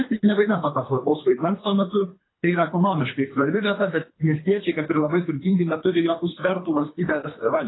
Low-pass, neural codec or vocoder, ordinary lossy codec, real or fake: 7.2 kHz; codec, 24 kHz, 3 kbps, HILCodec; AAC, 16 kbps; fake